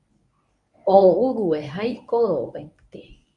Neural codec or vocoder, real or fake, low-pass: codec, 24 kHz, 0.9 kbps, WavTokenizer, medium speech release version 1; fake; 10.8 kHz